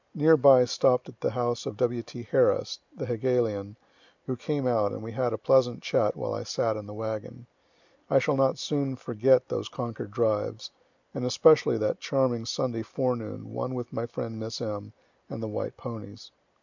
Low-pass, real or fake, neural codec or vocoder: 7.2 kHz; real; none